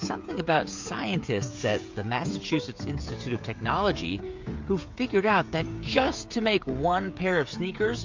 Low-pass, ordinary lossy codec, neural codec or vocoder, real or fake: 7.2 kHz; MP3, 48 kbps; codec, 16 kHz, 16 kbps, FreqCodec, smaller model; fake